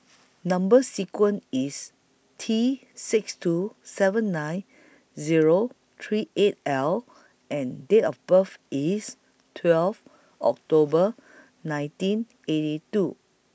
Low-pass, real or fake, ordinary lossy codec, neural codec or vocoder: none; real; none; none